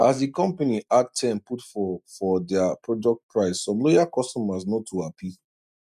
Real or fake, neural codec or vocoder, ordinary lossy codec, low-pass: real; none; none; 14.4 kHz